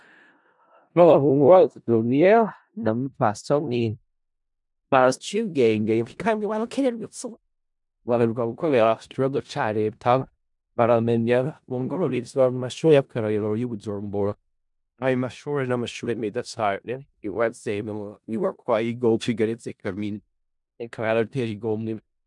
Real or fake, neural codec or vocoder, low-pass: fake; codec, 16 kHz in and 24 kHz out, 0.4 kbps, LongCat-Audio-Codec, four codebook decoder; 10.8 kHz